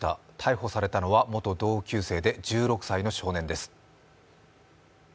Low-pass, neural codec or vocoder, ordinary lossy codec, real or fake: none; none; none; real